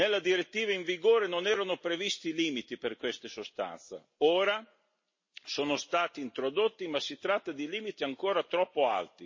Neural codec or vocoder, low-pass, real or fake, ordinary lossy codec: none; 7.2 kHz; real; MP3, 32 kbps